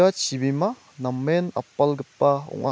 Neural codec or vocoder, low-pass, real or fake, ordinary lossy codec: none; none; real; none